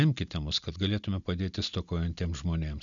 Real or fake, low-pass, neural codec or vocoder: real; 7.2 kHz; none